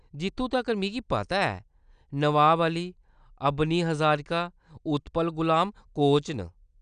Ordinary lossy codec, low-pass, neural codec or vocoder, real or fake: none; 9.9 kHz; none; real